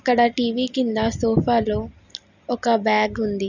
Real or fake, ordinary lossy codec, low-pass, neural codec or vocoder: real; none; 7.2 kHz; none